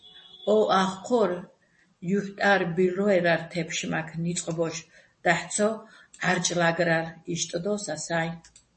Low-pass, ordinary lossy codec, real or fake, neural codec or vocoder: 10.8 kHz; MP3, 32 kbps; real; none